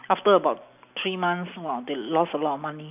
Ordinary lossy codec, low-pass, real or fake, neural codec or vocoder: none; 3.6 kHz; real; none